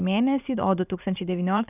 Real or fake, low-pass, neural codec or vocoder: fake; 3.6 kHz; vocoder, 44.1 kHz, 128 mel bands every 512 samples, BigVGAN v2